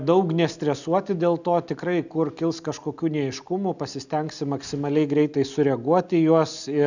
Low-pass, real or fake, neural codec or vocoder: 7.2 kHz; real; none